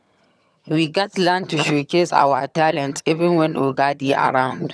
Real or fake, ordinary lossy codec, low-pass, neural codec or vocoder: fake; none; none; vocoder, 22.05 kHz, 80 mel bands, HiFi-GAN